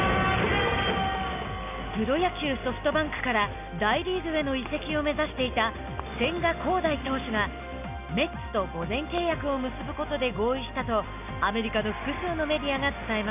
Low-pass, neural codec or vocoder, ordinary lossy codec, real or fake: 3.6 kHz; none; none; real